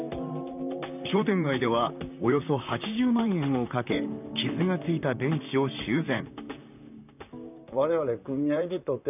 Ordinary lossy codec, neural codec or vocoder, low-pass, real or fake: none; vocoder, 44.1 kHz, 128 mel bands, Pupu-Vocoder; 3.6 kHz; fake